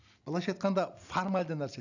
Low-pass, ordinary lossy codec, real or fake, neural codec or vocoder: 7.2 kHz; none; real; none